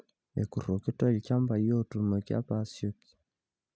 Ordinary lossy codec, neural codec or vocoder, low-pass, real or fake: none; none; none; real